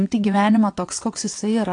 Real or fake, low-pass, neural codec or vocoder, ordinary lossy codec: fake; 9.9 kHz; vocoder, 22.05 kHz, 80 mel bands, WaveNeXt; AAC, 48 kbps